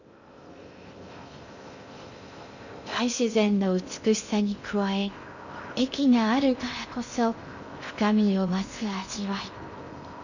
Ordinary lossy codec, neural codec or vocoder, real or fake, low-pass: none; codec, 16 kHz in and 24 kHz out, 0.6 kbps, FocalCodec, streaming, 2048 codes; fake; 7.2 kHz